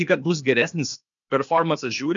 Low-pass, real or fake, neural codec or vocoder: 7.2 kHz; fake; codec, 16 kHz, 0.8 kbps, ZipCodec